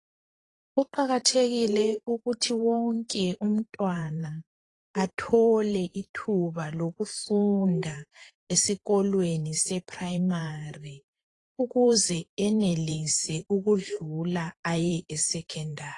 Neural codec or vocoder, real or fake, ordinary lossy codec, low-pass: vocoder, 44.1 kHz, 128 mel bands every 512 samples, BigVGAN v2; fake; AAC, 32 kbps; 10.8 kHz